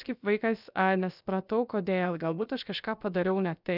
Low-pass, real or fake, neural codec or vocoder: 5.4 kHz; fake; codec, 16 kHz, about 1 kbps, DyCAST, with the encoder's durations